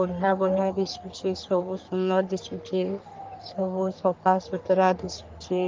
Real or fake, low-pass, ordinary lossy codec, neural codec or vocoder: fake; 7.2 kHz; Opus, 24 kbps; codec, 44.1 kHz, 3.4 kbps, Pupu-Codec